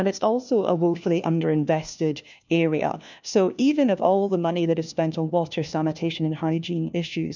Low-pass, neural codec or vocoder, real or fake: 7.2 kHz; codec, 16 kHz, 1 kbps, FunCodec, trained on LibriTTS, 50 frames a second; fake